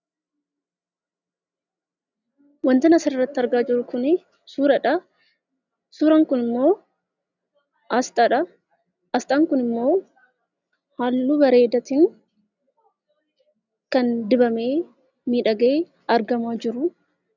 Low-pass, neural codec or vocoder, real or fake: 7.2 kHz; none; real